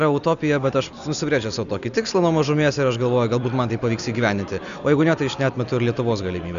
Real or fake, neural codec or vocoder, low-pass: real; none; 7.2 kHz